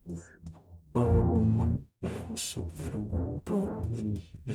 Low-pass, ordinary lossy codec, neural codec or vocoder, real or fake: none; none; codec, 44.1 kHz, 0.9 kbps, DAC; fake